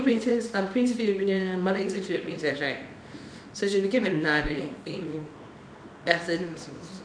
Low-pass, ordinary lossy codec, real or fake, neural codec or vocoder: 9.9 kHz; none; fake; codec, 24 kHz, 0.9 kbps, WavTokenizer, small release